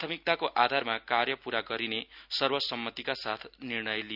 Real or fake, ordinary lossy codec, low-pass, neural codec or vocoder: real; none; 5.4 kHz; none